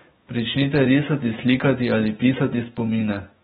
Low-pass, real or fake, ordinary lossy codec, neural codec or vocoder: 19.8 kHz; fake; AAC, 16 kbps; autoencoder, 48 kHz, 128 numbers a frame, DAC-VAE, trained on Japanese speech